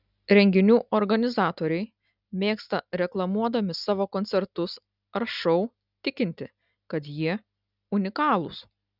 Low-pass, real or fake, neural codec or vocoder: 5.4 kHz; real; none